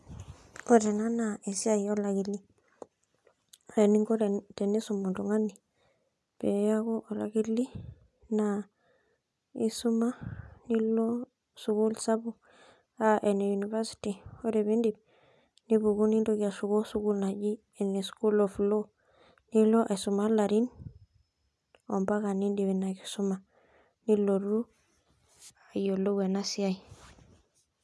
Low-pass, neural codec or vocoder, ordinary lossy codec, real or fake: none; none; none; real